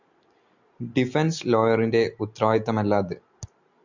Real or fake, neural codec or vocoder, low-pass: real; none; 7.2 kHz